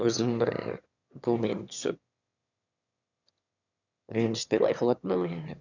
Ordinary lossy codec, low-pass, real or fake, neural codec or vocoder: none; 7.2 kHz; fake; autoencoder, 22.05 kHz, a latent of 192 numbers a frame, VITS, trained on one speaker